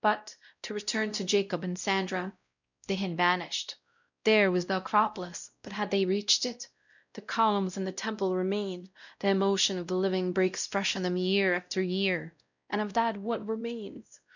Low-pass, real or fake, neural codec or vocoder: 7.2 kHz; fake; codec, 16 kHz, 0.5 kbps, X-Codec, WavLM features, trained on Multilingual LibriSpeech